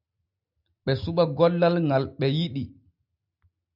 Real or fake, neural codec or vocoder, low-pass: real; none; 5.4 kHz